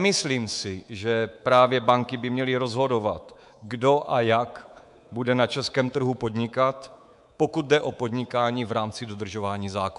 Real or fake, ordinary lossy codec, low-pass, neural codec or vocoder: fake; MP3, 96 kbps; 10.8 kHz; codec, 24 kHz, 3.1 kbps, DualCodec